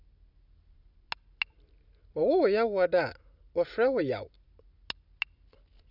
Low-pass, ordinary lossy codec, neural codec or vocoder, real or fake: 5.4 kHz; none; none; real